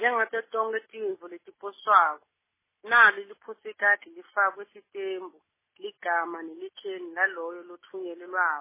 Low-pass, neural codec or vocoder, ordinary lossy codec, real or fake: 3.6 kHz; none; MP3, 16 kbps; real